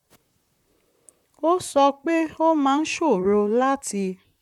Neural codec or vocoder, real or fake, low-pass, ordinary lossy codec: vocoder, 44.1 kHz, 128 mel bands, Pupu-Vocoder; fake; 19.8 kHz; none